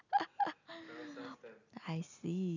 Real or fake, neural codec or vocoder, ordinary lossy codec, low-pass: real; none; none; 7.2 kHz